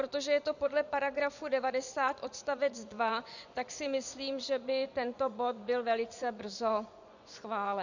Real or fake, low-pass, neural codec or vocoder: fake; 7.2 kHz; vocoder, 24 kHz, 100 mel bands, Vocos